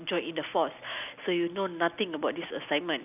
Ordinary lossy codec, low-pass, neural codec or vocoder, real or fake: none; 3.6 kHz; none; real